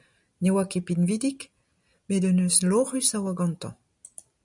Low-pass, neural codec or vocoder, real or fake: 10.8 kHz; none; real